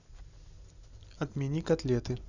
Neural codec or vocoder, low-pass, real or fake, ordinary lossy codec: none; 7.2 kHz; real; none